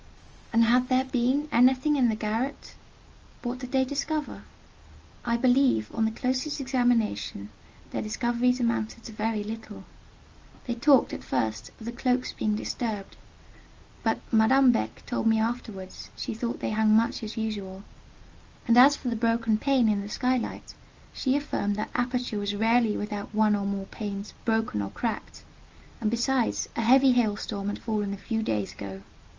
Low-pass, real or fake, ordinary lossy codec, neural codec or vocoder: 7.2 kHz; real; Opus, 24 kbps; none